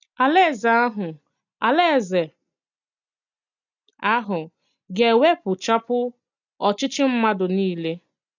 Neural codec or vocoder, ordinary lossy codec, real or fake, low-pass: none; none; real; 7.2 kHz